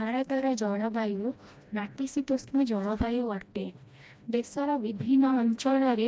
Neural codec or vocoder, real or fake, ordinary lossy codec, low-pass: codec, 16 kHz, 1 kbps, FreqCodec, smaller model; fake; none; none